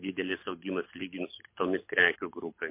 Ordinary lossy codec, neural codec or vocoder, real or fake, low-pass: MP3, 24 kbps; codec, 24 kHz, 6 kbps, HILCodec; fake; 3.6 kHz